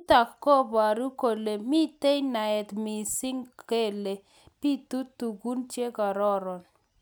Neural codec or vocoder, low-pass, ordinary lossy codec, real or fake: none; none; none; real